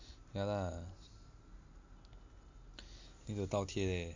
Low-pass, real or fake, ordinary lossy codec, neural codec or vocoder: 7.2 kHz; real; MP3, 64 kbps; none